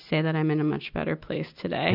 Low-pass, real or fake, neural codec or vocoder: 5.4 kHz; real; none